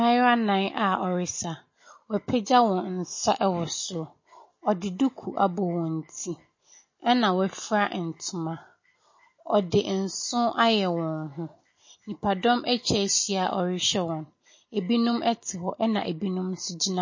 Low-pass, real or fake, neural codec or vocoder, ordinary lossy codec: 7.2 kHz; real; none; MP3, 32 kbps